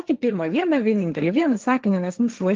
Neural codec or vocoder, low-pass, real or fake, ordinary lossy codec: codec, 16 kHz, 1.1 kbps, Voila-Tokenizer; 7.2 kHz; fake; Opus, 32 kbps